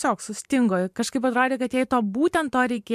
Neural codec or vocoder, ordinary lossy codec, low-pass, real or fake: vocoder, 44.1 kHz, 128 mel bands every 512 samples, BigVGAN v2; MP3, 96 kbps; 14.4 kHz; fake